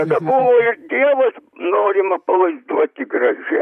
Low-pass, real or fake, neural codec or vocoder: 14.4 kHz; fake; vocoder, 44.1 kHz, 128 mel bands, Pupu-Vocoder